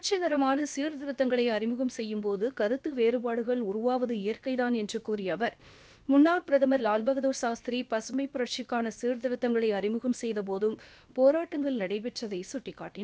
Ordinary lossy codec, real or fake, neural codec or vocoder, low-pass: none; fake; codec, 16 kHz, about 1 kbps, DyCAST, with the encoder's durations; none